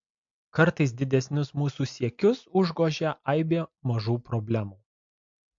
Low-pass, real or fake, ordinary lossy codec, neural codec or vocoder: 7.2 kHz; real; MP3, 48 kbps; none